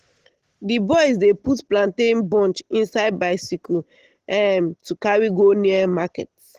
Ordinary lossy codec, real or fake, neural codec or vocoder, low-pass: Opus, 16 kbps; real; none; 14.4 kHz